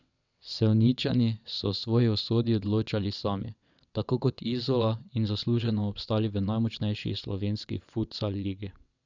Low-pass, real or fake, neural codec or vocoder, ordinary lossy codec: 7.2 kHz; fake; vocoder, 22.05 kHz, 80 mel bands, WaveNeXt; none